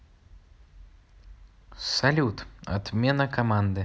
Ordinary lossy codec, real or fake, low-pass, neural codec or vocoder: none; real; none; none